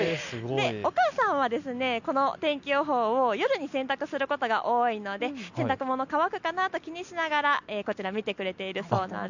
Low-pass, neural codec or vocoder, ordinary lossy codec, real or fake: 7.2 kHz; none; none; real